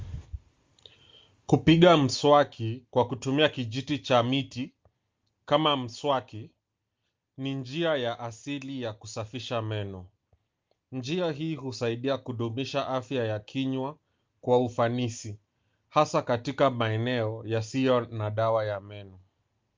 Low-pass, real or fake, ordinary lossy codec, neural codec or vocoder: 7.2 kHz; real; Opus, 32 kbps; none